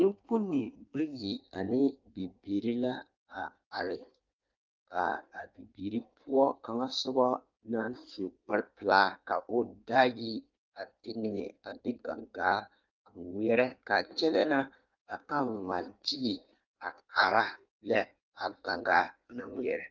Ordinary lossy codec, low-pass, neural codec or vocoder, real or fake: Opus, 24 kbps; 7.2 kHz; codec, 16 kHz in and 24 kHz out, 1.1 kbps, FireRedTTS-2 codec; fake